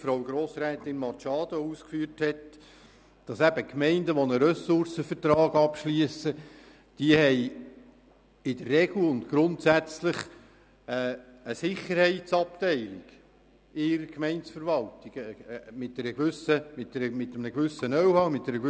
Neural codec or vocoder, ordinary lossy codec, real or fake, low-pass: none; none; real; none